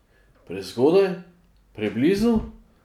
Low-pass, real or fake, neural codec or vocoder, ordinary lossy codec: 19.8 kHz; real; none; none